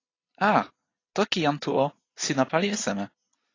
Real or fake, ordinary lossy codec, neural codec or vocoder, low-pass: real; AAC, 32 kbps; none; 7.2 kHz